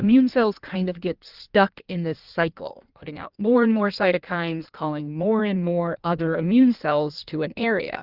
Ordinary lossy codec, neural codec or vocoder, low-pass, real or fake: Opus, 24 kbps; codec, 16 kHz in and 24 kHz out, 1.1 kbps, FireRedTTS-2 codec; 5.4 kHz; fake